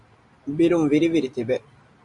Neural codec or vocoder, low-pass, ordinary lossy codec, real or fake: none; 10.8 kHz; Opus, 64 kbps; real